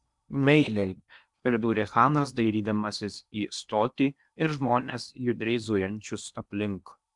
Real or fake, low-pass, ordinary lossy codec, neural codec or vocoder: fake; 10.8 kHz; MP3, 96 kbps; codec, 16 kHz in and 24 kHz out, 0.8 kbps, FocalCodec, streaming, 65536 codes